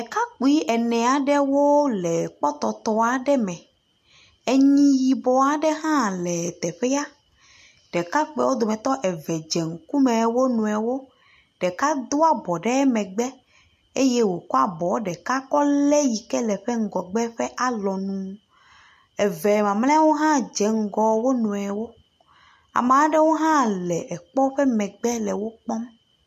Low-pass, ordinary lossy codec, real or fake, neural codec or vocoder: 14.4 kHz; MP3, 64 kbps; real; none